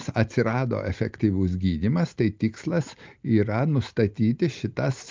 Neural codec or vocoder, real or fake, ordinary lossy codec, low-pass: none; real; Opus, 32 kbps; 7.2 kHz